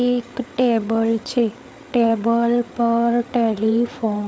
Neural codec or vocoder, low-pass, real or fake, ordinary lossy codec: codec, 16 kHz, 8 kbps, FreqCodec, larger model; none; fake; none